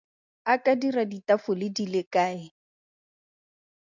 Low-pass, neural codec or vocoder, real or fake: 7.2 kHz; none; real